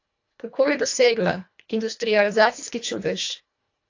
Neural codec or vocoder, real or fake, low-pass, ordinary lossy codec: codec, 24 kHz, 1.5 kbps, HILCodec; fake; 7.2 kHz; AAC, 48 kbps